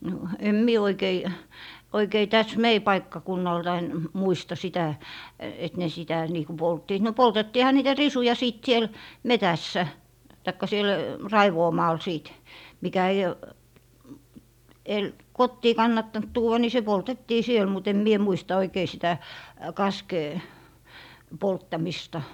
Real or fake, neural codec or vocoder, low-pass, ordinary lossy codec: real; none; 19.8 kHz; none